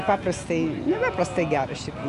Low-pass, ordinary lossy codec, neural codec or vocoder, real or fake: 10.8 kHz; AAC, 96 kbps; none; real